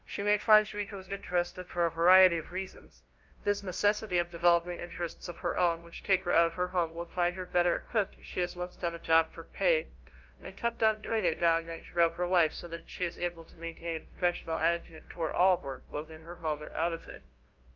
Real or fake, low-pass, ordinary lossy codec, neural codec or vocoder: fake; 7.2 kHz; Opus, 32 kbps; codec, 16 kHz, 0.5 kbps, FunCodec, trained on LibriTTS, 25 frames a second